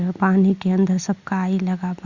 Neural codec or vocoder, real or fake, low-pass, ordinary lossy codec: none; real; 7.2 kHz; Opus, 64 kbps